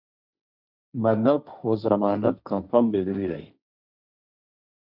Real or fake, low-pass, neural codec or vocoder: fake; 5.4 kHz; codec, 16 kHz, 1.1 kbps, Voila-Tokenizer